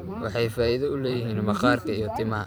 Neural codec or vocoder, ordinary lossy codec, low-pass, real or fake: vocoder, 44.1 kHz, 128 mel bands every 512 samples, BigVGAN v2; none; none; fake